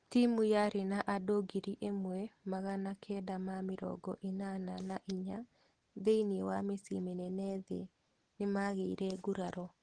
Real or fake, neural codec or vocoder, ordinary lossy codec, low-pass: real; none; Opus, 16 kbps; 9.9 kHz